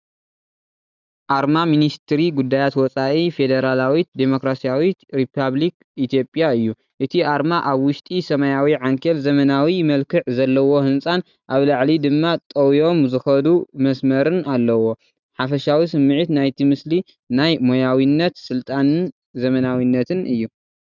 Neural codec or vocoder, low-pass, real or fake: none; 7.2 kHz; real